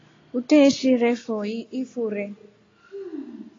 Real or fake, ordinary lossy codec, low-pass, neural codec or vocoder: real; AAC, 32 kbps; 7.2 kHz; none